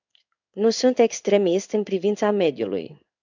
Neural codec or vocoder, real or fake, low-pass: codec, 16 kHz in and 24 kHz out, 1 kbps, XY-Tokenizer; fake; 7.2 kHz